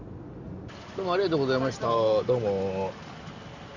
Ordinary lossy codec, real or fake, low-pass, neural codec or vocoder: Opus, 64 kbps; real; 7.2 kHz; none